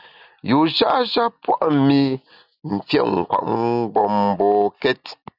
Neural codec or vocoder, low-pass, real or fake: none; 5.4 kHz; real